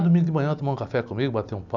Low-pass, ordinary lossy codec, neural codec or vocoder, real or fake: 7.2 kHz; none; none; real